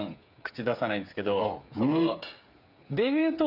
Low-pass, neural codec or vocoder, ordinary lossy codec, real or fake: 5.4 kHz; codec, 16 kHz, 8 kbps, FreqCodec, smaller model; none; fake